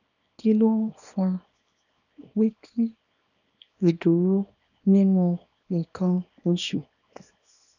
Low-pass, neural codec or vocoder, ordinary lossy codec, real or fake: 7.2 kHz; codec, 24 kHz, 0.9 kbps, WavTokenizer, small release; none; fake